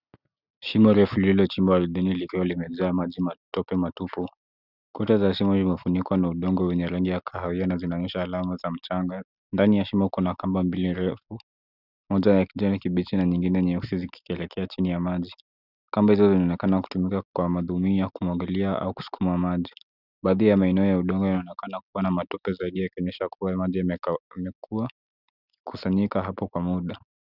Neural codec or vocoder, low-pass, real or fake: none; 5.4 kHz; real